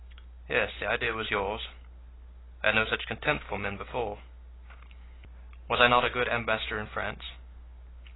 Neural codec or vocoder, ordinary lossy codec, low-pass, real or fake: none; AAC, 16 kbps; 7.2 kHz; real